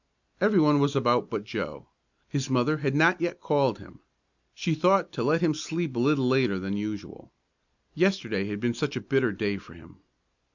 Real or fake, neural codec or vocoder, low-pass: real; none; 7.2 kHz